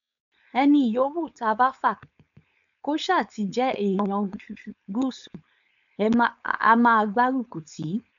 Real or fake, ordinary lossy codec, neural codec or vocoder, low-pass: fake; MP3, 96 kbps; codec, 16 kHz, 4.8 kbps, FACodec; 7.2 kHz